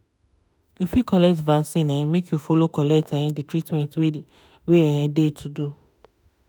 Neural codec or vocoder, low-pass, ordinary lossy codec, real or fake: autoencoder, 48 kHz, 32 numbers a frame, DAC-VAE, trained on Japanese speech; none; none; fake